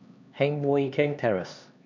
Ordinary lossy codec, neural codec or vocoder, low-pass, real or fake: none; codec, 16 kHz, 2 kbps, X-Codec, HuBERT features, trained on LibriSpeech; 7.2 kHz; fake